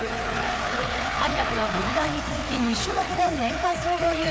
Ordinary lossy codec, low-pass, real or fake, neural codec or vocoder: none; none; fake; codec, 16 kHz, 4 kbps, FreqCodec, larger model